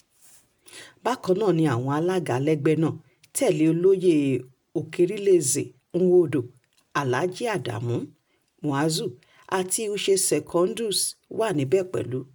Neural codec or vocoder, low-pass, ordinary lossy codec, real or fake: none; none; none; real